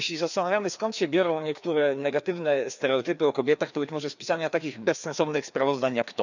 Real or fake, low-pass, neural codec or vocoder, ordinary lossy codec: fake; 7.2 kHz; codec, 16 kHz, 2 kbps, FreqCodec, larger model; none